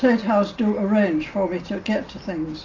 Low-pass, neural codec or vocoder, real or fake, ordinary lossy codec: 7.2 kHz; none; real; AAC, 32 kbps